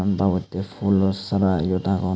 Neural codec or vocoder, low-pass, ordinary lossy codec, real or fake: none; none; none; real